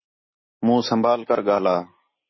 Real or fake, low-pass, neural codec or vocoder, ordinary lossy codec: real; 7.2 kHz; none; MP3, 24 kbps